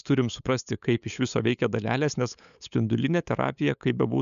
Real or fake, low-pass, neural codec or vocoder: fake; 7.2 kHz; codec, 16 kHz, 8 kbps, FunCodec, trained on LibriTTS, 25 frames a second